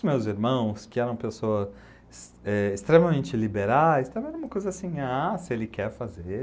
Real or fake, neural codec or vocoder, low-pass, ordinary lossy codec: real; none; none; none